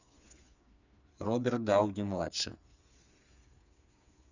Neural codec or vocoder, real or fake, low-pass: codec, 16 kHz, 4 kbps, FreqCodec, smaller model; fake; 7.2 kHz